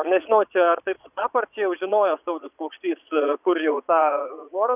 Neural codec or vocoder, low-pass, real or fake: vocoder, 22.05 kHz, 80 mel bands, Vocos; 3.6 kHz; fake